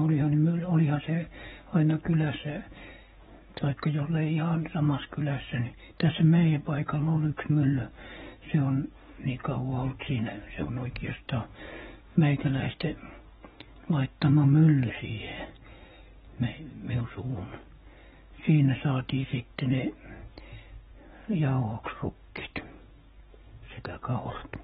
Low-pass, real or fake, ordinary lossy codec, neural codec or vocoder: 19.8 kHz; fake; AAC, 16 kbps; codec, 44.1 kHz, 7.8 kbps, DAC